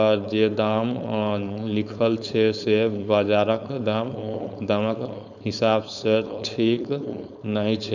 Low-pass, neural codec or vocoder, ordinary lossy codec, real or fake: 7.2 kHz; codec, 16 kHz, 4.8 kbps, FACodec; none; fake